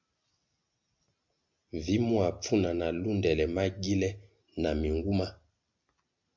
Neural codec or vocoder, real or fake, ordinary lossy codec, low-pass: none; real; MP3, 64 kbps; 7.2 kHz